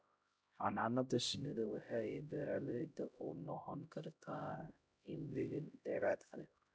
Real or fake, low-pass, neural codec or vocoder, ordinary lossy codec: fake; none; codec, 16 kHz, 0.5 kbps, X-Codec, HuBERT features, trained on LibriSpeech; none